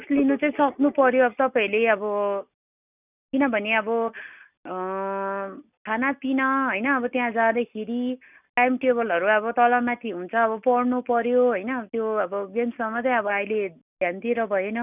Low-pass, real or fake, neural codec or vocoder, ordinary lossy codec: 3.6 kHz; real; none; none